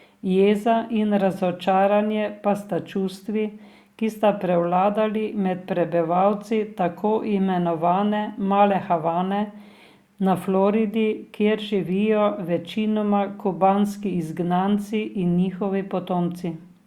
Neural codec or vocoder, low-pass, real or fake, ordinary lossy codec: none; 19.8 kHz; real; Opus, 64 kbps